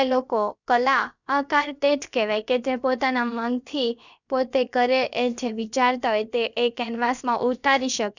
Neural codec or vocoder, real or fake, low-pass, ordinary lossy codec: codec, 16 kHz, about 1 kbps, DyCAST, with the encoder's durations; fake; 7.2 kHz; none